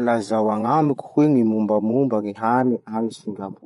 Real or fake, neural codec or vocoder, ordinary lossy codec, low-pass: fake; vocoder, 24 kHz, 100 mel bands, Vocos; MP3, 96 kbps; 10.8 kHz